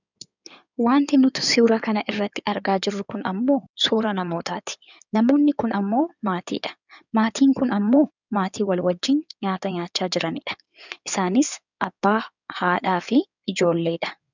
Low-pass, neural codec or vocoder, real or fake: 7.2 kHz; codec, 16 kHz in and 24 kHz out, 2.2 kbps, FireRedTTS-2 codec; fake